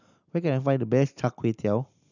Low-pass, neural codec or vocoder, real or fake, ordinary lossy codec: 7.2 kHz; none; real; none